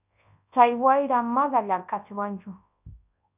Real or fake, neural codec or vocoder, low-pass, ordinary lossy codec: fake; codec, 24 kHz, 0.9 kbps, WavTokenizer, large speech release; 3.6 kHz; AAC, 32 kbps